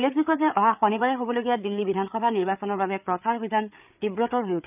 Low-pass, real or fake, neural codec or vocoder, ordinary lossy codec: 3.6 kHz; fake; codec, 16 kHz, 8 kbps, FreqCodec, larger model; none